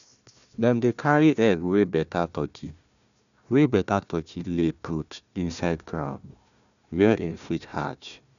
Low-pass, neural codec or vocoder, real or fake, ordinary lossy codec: 7.2 kHz; codec, 16 kHz, 1 kbps, FunCodec, trained on Chinese and English, 50 frames a second; fake; none